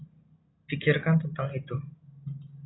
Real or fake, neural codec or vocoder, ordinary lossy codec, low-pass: real; none; AAC, 16 kbps; 7.2 kHz